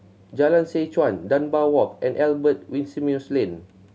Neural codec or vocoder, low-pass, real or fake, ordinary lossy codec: none; none; real; none